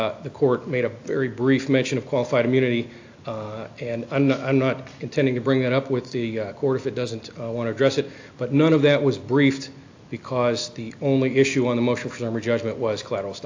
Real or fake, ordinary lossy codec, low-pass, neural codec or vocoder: real; AAC, 48 kbps; 7.2 kHz; none